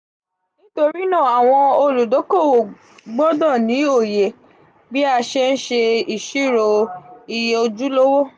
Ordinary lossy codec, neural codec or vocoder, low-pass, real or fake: none; none; 9.9 kHz; real